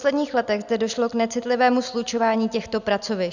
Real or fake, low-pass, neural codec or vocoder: real; 7.2 kHz; none